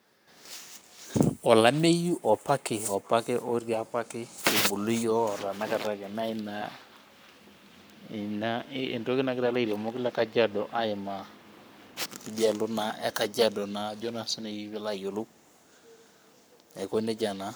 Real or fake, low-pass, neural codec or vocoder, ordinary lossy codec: fake; none; codec, 44.1 kHz, 7.8 kbps, Pupu-Codec; none